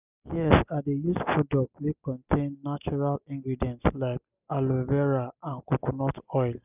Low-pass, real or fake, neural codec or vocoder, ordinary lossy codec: 3.6 kHz; real; none; none